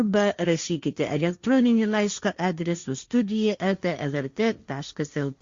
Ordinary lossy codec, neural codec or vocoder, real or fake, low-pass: Opus, 64 kbps; codec, 16 kHz, 1.1 kbps, Voila-Tokenizer; fake; 7.2 kHz